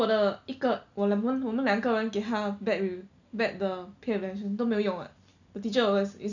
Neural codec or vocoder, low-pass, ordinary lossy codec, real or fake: none; 7.2 kHz; none; real